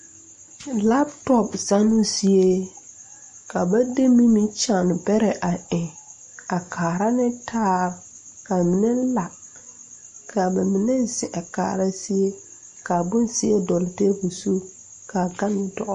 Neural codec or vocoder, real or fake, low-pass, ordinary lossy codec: none; real; 9.9 kHz; MP3, 48 kbps